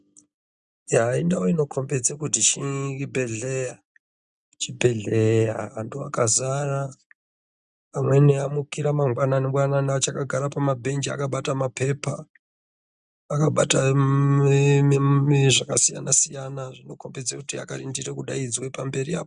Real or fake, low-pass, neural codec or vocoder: real; 9.9 kHz; none